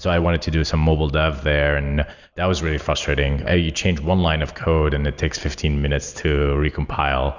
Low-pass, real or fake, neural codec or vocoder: 7.2 kHz; real; none